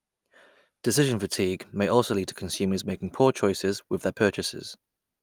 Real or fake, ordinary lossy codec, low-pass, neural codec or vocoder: real; Opus, 32 kbps; 19.8 kHz; none